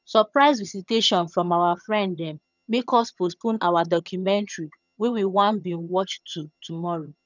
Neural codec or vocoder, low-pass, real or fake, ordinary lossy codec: vocoder, 22.05 kHz, 80 mel bands, HiFi-GAN; 7.2 kHz; fake; none